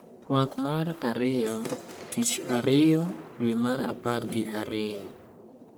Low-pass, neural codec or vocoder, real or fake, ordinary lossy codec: none; codec, 44.1 kHz, 1.7 kbps, Pupu-Codec; fake; none